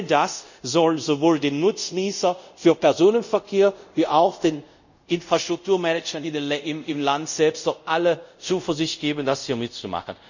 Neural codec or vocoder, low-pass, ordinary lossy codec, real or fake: codec, 24 kHz, 0.5 kbps, DualCodec; 7.2 kHz; none; fake